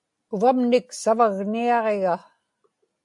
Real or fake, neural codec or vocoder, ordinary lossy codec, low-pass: real; none; AAC, 64 kbps; 10.8 kHz